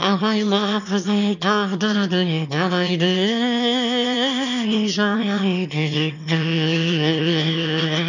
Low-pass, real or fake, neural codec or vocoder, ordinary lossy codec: 7.2 kHz; fake; autoencoder, 22.05 kHz, a latent of 192 numbers a frame, VITS, trained on one speaker; none